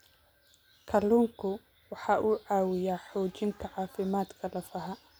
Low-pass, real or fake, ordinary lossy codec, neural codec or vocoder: none; real; none; none